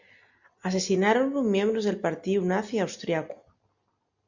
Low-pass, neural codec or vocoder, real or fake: 7.2 kHz; none; real